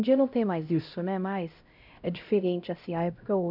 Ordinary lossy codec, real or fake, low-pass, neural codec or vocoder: none; fake; 5.4 kHz; codec, 16 kHz, 0.5 kbps, X-Codec, HuBERT features, trained on LibriSpeech